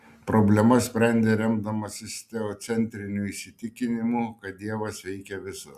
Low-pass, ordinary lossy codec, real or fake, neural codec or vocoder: 14.4 kHz; Opus, 64 kbps; real; none